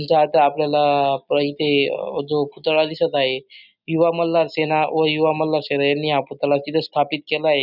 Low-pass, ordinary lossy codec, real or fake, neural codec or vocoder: 5.4 kHz; none; real; none